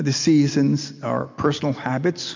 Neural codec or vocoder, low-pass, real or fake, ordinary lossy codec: none; 7.2 kHz; real; MP3, 64 kbps